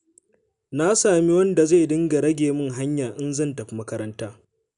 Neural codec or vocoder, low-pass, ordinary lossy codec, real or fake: none; 10.8 kHz; none; real